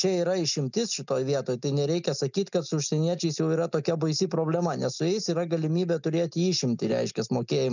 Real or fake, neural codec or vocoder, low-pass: real; none; 7.2 kHz